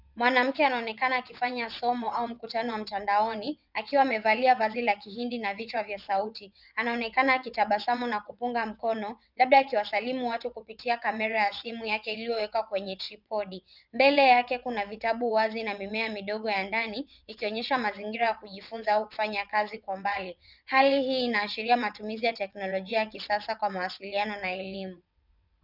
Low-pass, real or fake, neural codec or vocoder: 5.4 kHz; fake; vocoder, 22.05 kHz, 80 mel bands, WaveNeXt